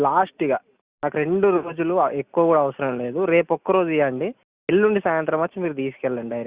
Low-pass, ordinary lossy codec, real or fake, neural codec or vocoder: 3.6 kHz; Opus, 64 kbps; real; none